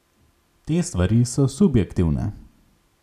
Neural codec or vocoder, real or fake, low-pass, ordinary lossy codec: none; real; 14.4 kHz; none